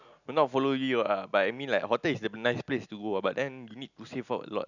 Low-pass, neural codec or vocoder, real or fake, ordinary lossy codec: 7.2 kHz; none; real; none